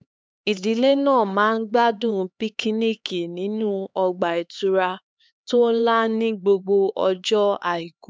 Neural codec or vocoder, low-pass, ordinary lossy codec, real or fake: codec, 16 kHz, 4 kbps, X-Codec, HuBERT features, trained on LibriSpeech; none; none; fake